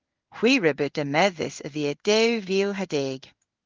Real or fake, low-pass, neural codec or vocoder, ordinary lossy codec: fake; 7.2 kHz; codec, 16 kHz in and 24 kHz out, 1 kbps, XY-Tokenizer; Opus, 32 kbps